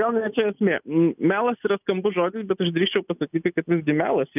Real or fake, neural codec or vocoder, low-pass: real; none; 3.6 kHz